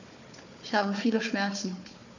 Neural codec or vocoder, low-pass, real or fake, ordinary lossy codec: codec, 16 kHz, 4 kbps, FunCodec, trained on Chinese and English, 50 frames a second; 7.2 kHz; fake; none